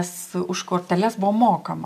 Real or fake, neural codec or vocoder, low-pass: real; none; 14.4 kHz